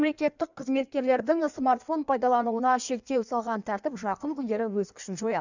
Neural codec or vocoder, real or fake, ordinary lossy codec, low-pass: codec, 16 kHz in and 24 kHz out, 1.1 kbps, FireRedTTS-2 codec; fake; none; 7.2 kHz